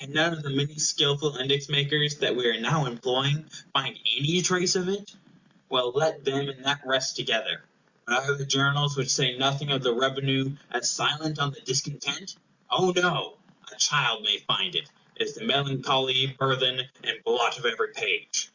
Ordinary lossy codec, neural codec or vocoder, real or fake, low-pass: Opus, 64 kbps; none; real; 7.2 kHz